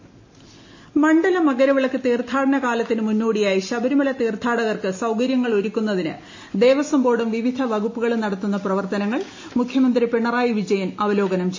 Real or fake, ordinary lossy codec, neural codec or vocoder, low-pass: real; MP3, 32 kbps; none; 7.2 kHz